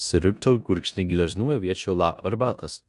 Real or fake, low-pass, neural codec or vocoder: fake; 10.8 kHz; codec, 16 kHz in and 24 kHz out, 0.9 kbps, LongCat-Audio-Codec, four codebook decoder